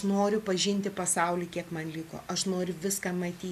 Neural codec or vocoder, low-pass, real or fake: none; 14.4 kHz; real